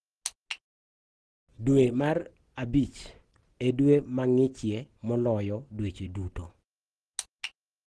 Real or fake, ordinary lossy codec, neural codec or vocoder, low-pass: real; Opus, 16 kbps; none; 10.8 kHz